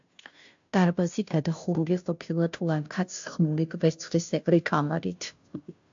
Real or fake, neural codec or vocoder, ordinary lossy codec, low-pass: fake; codec, 16 kHz, 0.5 kbps, FunCodec, trained on Chinese and English, 25 frames a second; AAC, 64 kbps; 7.2 kHz